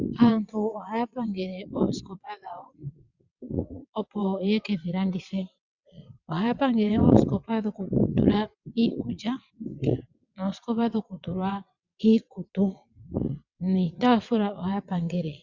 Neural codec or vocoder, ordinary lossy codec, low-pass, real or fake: vocoder, 22.05 kHz, 80 mel bands, WaveNeXt; Opus, 64 kbps; 7.2 kHz; fake